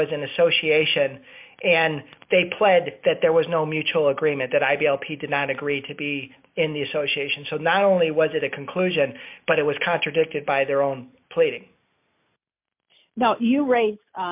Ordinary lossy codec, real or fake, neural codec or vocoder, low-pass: MP3, 32 kbps; real; none; 3.6 kHz